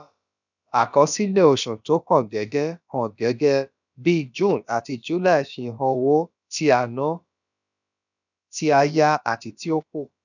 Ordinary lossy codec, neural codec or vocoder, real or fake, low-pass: none; codec, 16 kHz, about 1 kbps, DyCAST, with the encoder's durations; fake; 7.2 kHz